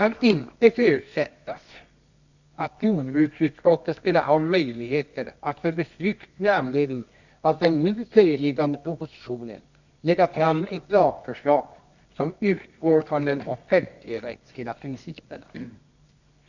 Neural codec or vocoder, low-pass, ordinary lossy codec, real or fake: codec, 24 kHz, 0.9 kbps, WavTokenizer, medium music audio release; 7.2 kHz; none; fake